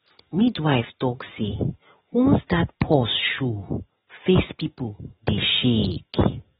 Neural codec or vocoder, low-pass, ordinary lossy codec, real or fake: none; 19.8 kHz; AAC, 16 kbps; real